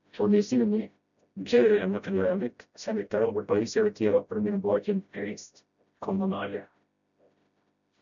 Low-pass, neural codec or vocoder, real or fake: 7.2 kHz; codec, 16 kHz, 0.5 kbps, FreqCodec, smaller model; fake